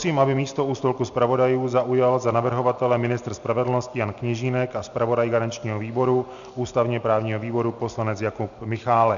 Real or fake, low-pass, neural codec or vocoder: real; 7.2 kHz; none